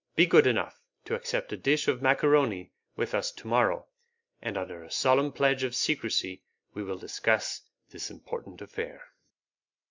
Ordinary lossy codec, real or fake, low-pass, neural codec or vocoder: MP3, 64 kbps; real; 7.2 kHz; none